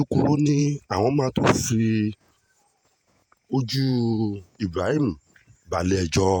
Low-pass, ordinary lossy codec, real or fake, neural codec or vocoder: 19.8 kHz; none; fake; vocoder, 48 kHz, 128 mel bands, Vocos